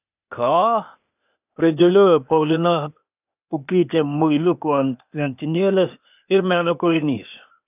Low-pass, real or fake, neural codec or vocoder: 3.6 kHz; fake; codec, 16 kHz, 0.8 kbps, ZipCodec